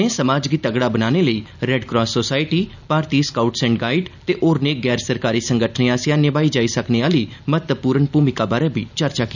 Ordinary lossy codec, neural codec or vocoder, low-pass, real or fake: none; none; 7.2 kHz; real